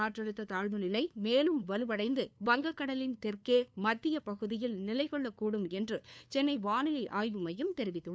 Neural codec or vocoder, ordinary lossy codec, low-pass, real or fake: codec, 16 kHz, 2 kbps, FunCodec, trained on LibriTTS, 25 frames a second; none; none; fake